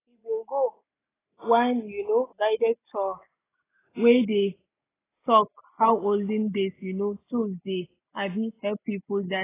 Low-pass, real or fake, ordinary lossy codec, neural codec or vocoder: 3.6 kHz; real; AAC, 16 kbps; none